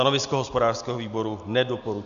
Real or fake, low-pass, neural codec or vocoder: real; 7.2 kHz; none